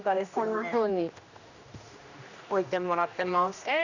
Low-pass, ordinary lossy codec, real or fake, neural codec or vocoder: 7.2 kHz; none; fake; codec, 16 kHz, 1 kbps, X-Codec, HuBERT features, trained on general audio